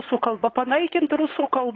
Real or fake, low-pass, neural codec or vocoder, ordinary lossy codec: fake; 7.2 kHz; codec, 16 kHz, 4.8 kbps, FACodec; AAC, 32 kbps